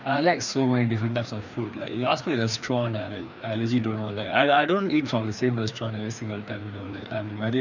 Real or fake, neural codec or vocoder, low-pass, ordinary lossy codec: fake; codec, 16 kHz, 2 kbps, FreqCodec, larger model; 7.2 kHz; none